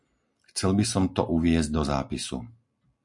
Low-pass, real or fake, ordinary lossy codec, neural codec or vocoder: 10.8 kHz; real; MP3, 64 kbps; none